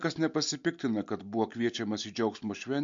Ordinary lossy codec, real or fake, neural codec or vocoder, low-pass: MP3, 48 kbps; real; none; 7.2 kHz